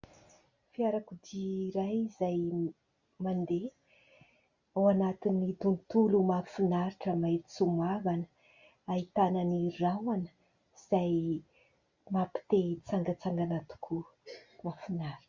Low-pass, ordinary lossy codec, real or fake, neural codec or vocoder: 7.2 kHz; Opus, 64 kbps; real; none